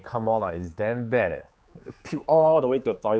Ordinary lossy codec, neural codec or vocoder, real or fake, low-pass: none; codec, 16 kHz, 4 kbps, X-Codec, HuBERT features, trained on general audio; fake; none